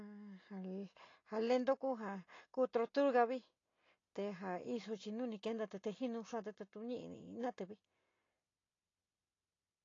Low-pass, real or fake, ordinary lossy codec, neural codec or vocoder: 7.2 kHz; real; AAC, 32 kbps; none